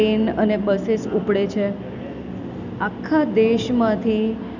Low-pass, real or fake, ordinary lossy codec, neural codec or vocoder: 7.2 kHz; real; none; none